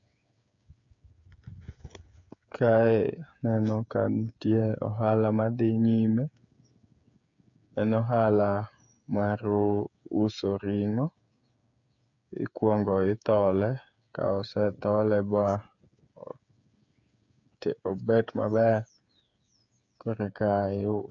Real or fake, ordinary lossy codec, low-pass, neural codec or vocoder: fake; AAC, 64 kbps; 7.2 kHz; codec, 16 kHz, 8 kbps, FreqCodec, smaller model